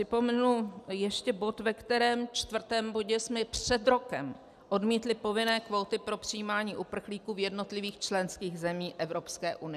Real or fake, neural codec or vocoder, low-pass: real; none; 14.4 kHz